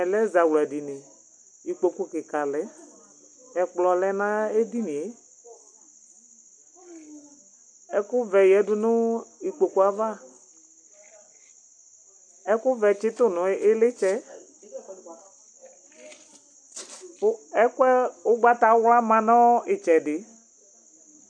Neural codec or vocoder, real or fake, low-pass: none; real; 9.9 kHz